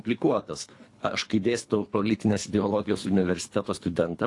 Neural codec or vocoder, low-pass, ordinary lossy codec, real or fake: codec, 24 kHz, 3 kbps, HILCodec; 10.8 kHz; AAC, 48 kbps; fake